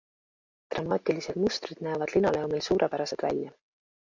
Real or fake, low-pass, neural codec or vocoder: real; 7.2 kHz; none